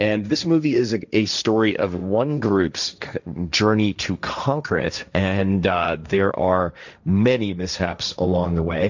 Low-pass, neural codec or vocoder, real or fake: 7.2 kHz; codec, 16 kHz, 1.1 kbps, Voila-Tokenizer; fake